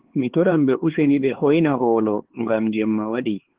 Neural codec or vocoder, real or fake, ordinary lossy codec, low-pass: codec, 16 kHz, 4 kbps, X-Codec, HuBERT features, trained on LibriSpeech; fake; Opus, 16 kbps; 3.6 kHz